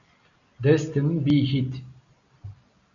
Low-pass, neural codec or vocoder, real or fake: 7.2 kHz; none; real